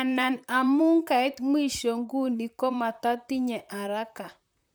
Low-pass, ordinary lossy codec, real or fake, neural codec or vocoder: none; none; fake; vocoder, 44.1 kHz, 128 mel bands, Pupu-Vocoder